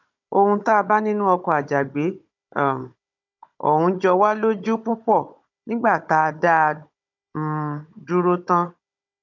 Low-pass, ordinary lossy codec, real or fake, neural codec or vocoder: 7.2 kHz; none; fake; codec, 16 kHz, 16 kbps, FunCodec, trained on Chinese and English, 50 frames a second